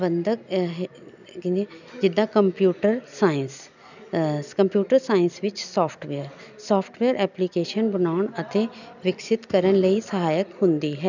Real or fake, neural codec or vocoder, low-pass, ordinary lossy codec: real; none; 7.2 kHz; none